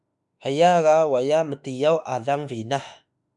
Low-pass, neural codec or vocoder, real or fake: 10.8 kHz; autoencoder, 48 kHz, 32 numbers a frame, DAC-VAE, trained on Japanese speech; fake